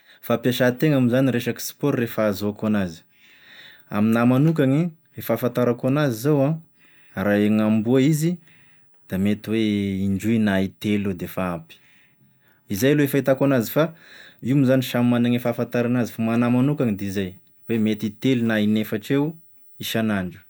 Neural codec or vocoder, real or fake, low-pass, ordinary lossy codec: none; real; none; none